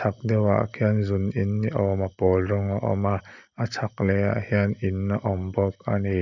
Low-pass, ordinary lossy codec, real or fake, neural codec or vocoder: 7.2 kHz; none; real; none